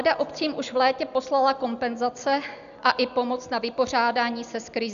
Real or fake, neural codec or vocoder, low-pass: real; none; 7.2 kHz